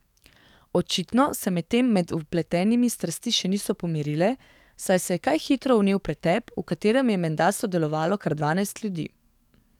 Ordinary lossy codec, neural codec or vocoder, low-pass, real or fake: none; codec, 44.1 kHz, 7.8 kbps, DAC; 19.8 kHz; fake